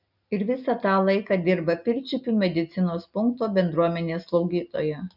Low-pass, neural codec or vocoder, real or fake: 5.4 kHz; none; real